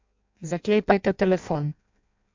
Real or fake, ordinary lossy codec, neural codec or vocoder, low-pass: fake; MP3, 64 kbps; codec, 16 kHz in and 24 kHz out, 0.6 kbps, FireRedTTS-2 codec; 7.2 kHz